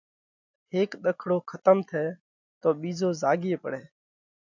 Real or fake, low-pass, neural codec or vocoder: real; 7.2 kHz; none